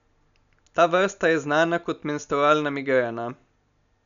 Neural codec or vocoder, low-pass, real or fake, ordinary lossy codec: none; 7.2 kHz; real; none